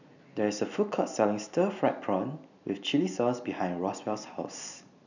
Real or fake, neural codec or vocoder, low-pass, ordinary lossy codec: real; none; 7.2 kHz; none